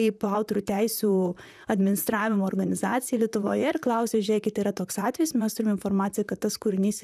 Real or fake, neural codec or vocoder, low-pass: fake; vocoder, 44.1 kHz, 128 mel bands, Pupu-Vocoder; 14.4 kHz